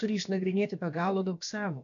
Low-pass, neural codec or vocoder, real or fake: 7.2 kHz; codec, 16 kHz, about 1 kbps, DyCAST, with the encoder's durations; fake